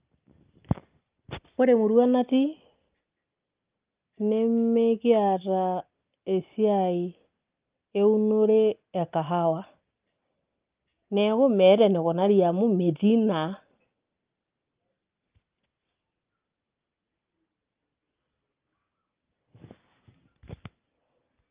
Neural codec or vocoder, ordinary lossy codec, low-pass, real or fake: none; Opus, 24 kbps; 3.6 kHz; real